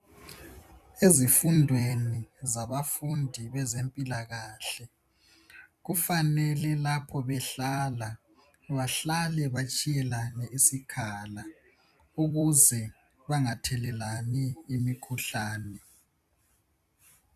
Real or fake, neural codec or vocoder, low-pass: fake; vocoder, 44.1 kHz, 128 mel bands every 256 samples, BigVGAN v2; 14.4 kHz